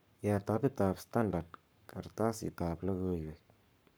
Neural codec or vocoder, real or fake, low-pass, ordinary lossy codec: codec, 44.1 kHz, 7.8 kbps, Pupu-Codec; fake; none; none